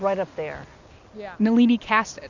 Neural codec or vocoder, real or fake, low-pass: none; real; 7.2 kHz